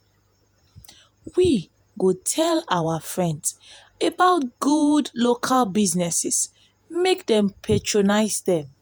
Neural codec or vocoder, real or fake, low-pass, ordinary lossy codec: vocoder, 48 kHz, 128 mel bands, Vocos; fake; none; none